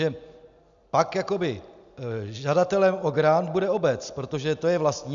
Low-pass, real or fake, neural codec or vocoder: 7.2 kHz; real; none